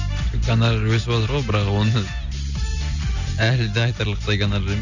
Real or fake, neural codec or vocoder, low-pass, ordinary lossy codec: real; none; 7.2 kHz; none